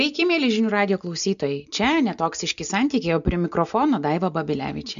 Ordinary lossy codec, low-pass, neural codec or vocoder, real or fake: AAC, 96 kbps; 7.2 kHz; none; real